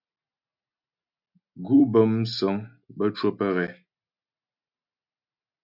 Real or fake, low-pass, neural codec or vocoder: real; 5.4 kHz; none